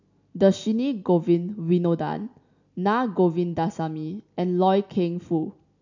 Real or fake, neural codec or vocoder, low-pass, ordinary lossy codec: real; none; 7.2 kHz; none